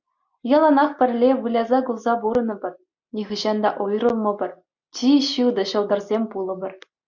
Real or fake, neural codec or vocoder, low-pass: real; none; 7.2 kHz